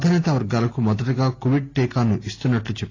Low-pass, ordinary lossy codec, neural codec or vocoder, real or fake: 7.2 kHz; AAC, 32 kbps; none; real